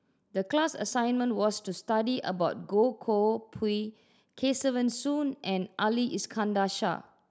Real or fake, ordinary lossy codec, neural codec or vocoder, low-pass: real; none; none; none